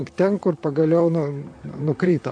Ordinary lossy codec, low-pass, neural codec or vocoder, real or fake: MP3, 64 kbps; 9.9 kHz; vocoder, 44.1 kHz, 128 mel bands, Pupu-Vocoder; fake